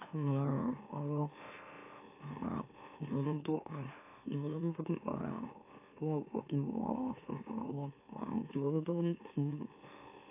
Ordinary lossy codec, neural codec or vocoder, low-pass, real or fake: AAC, 32 kbps; autoencoder, 44.1 kHz, a latent of 192 numbers a frame, MeloTTS; 3.6 kHz; fake